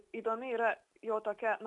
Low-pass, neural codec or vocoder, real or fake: 10.8 kHz; none; real